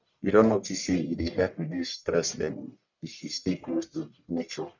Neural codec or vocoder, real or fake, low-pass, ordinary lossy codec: codec, 44.1 kHz, 1.7 kbps, Pupu-Codec; fake; 7.2 kHz; none